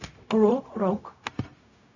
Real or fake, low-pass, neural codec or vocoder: fake; 7.2 kHz; codec, 16 kHz, 0.4 kbps, LongCat-Audio-Codec